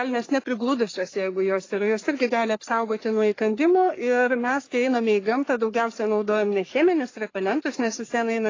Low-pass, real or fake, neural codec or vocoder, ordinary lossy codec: 7.2 kHz; fake; codec, 44.1 kHz, 3.4 kbps, Pupu-Codec; AAC, 32 kbps